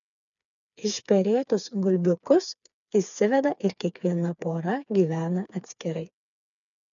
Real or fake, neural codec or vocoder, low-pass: fake; codec, 16 kHz, 4 kbps, FreqCodec, smaller model; 7.2 kHz